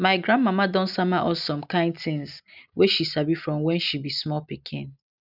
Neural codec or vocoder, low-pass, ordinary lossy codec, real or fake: none; 5.4 kHz; none; real